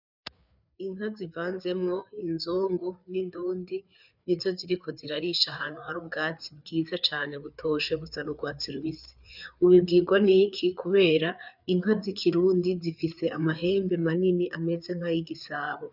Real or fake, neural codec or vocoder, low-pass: fake; codec, 16 kHz, 4 kbps, FreqCodec, larger model; 5.4 kHz